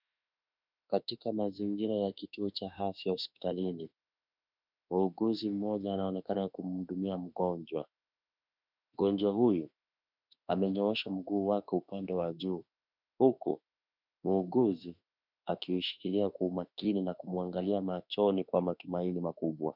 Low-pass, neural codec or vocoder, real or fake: 5.4 kHz; autoencoder, 48 kHz, 32 numbers a frame, DAC-VAE, trained on Japanese speech; fake